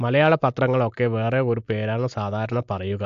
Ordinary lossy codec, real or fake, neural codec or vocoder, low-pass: AAC, 64 kbps; real; none; 7.2 kHz